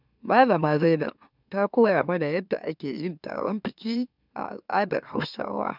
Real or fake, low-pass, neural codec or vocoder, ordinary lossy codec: fake; 5.4 kHz; autoencoder, 44.1 kHz, a latent of 192 numbers a frame, MeloTTS; none